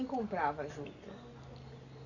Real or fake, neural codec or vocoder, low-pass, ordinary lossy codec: real; none; 7.2 kHz; none